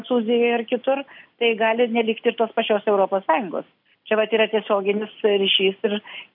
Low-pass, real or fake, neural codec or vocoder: 5.4 kHz; real; none